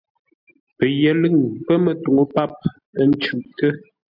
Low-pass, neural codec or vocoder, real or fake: 5.4 kHz; none; real